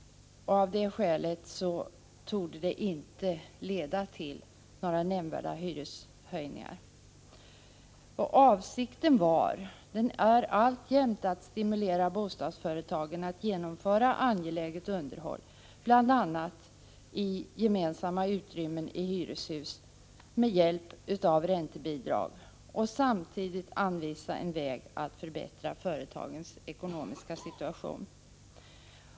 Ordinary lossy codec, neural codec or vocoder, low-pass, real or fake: none; none; none; real